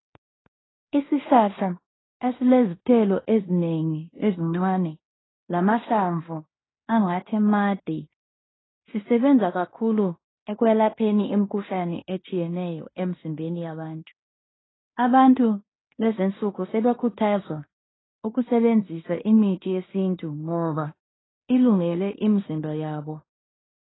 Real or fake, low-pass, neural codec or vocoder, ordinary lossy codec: fake; 7.2 kHz; codec, 16 kHz in and 24 kHz out, 0.9 kbps, LongCat-Audio-Codec, fine tuned four codebook decoder; AAC, 16 kbps